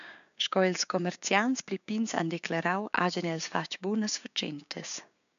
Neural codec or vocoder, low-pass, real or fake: codec, 16 kHz, 6 kbps, DAC; 7.2 kHz; fake